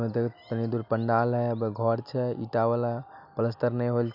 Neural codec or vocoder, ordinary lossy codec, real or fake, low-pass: none; none; real; 5.4 kHz